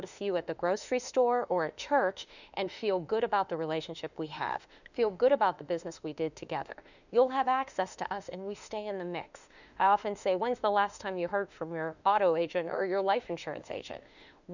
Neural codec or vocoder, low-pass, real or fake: autoencoder, 48 kHz, 32 numbers a frame, DAC-VAE, trained on Japanese speech; 7.2 kHz; fake